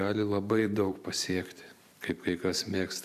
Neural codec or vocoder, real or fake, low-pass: vocoder, 44.1 kHz, 128 mel bands every 512 samples, BigVGAN v2; fake; 14.4 kHz